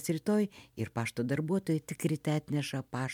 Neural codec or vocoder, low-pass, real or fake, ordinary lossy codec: none; 19.8 kHz; real; MP3, 96 kbps